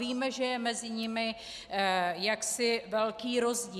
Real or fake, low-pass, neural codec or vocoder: real; 14.4 kHz; none